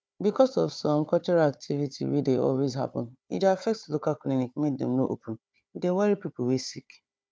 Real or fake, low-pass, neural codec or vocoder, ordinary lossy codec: fake; none; codec, 16 kHz, 4 kbps, FunCodec, trained on Chinese and English, 50 frames a second; none